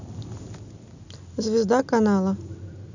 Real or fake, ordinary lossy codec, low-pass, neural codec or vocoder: real; none; 7.2 kHz; none